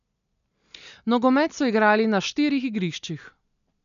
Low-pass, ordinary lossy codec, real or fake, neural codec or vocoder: 7.2 kHz; none; real; none